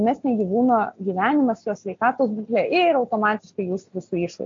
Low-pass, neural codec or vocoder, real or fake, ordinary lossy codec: 7.2 kHz; none; real; AAC, 48 kbps